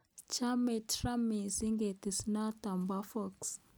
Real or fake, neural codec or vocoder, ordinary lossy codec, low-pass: real; none; none; none